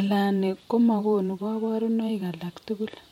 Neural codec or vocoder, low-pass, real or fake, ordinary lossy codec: vocoder, 44.1 kHz, 128 mel bands every 512 samples, BigVGAN v2; 19.8 kHz; fake; MP3, 64 kbps